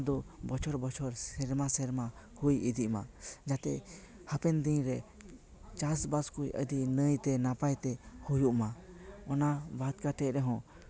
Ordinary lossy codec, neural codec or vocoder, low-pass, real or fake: none; none; none; real